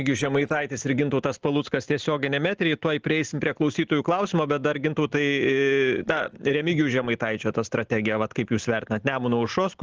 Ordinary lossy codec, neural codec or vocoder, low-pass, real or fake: Opus, 16 kbps; none; 7.2 kHz; real